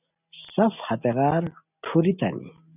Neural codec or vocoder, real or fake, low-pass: none; real; 3.6 kHz